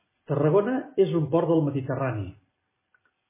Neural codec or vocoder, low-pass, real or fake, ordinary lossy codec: none; 3.6 kHz; real; MP3, 16 kbps